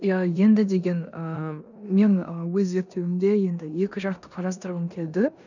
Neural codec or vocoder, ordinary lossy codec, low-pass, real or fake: codec, 16 kHz in and 24 kHz out, 0.9 kbps, LongCat-Audio-Codec, four codebook decoder; none; 7.2 kHz; fake